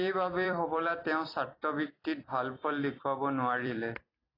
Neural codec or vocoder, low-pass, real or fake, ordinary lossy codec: vocoder, 44.1 kHz, 128 mel bands every 256 samples, BigVGAN v2; 5.4 kHz; fake; AAC, 24 kbps